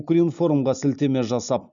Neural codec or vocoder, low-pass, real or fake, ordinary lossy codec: none; 7.2 kHz; real; none